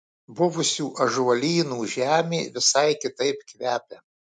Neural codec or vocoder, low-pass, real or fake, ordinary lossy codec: none; 9.9 kHz; real; MP3, 64 kbps